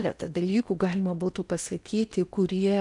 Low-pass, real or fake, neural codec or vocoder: 10.8 kHz; fake; codec, 16 kHz in and 24 kHz out, 0.8 kbps, FocalCodec, streaming, 65536 codes